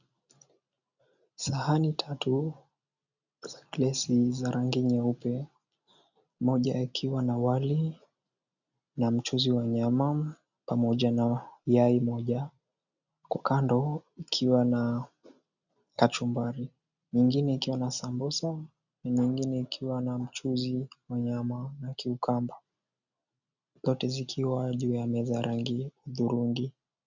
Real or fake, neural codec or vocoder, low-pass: real; none; 7.2 kHz